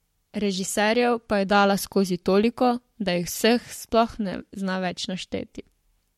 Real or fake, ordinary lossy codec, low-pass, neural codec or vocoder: fake; MP3, 64 kbps; 19.8 kHz; codec, 44.1 kHz, 7.8 kbps, Pupu-Codec